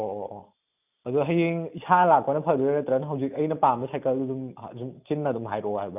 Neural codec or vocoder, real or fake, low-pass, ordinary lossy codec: none; real; 3.6 kHz; none